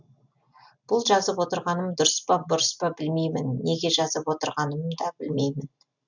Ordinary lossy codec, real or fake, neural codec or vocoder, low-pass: none; real; none; 7.2 kHz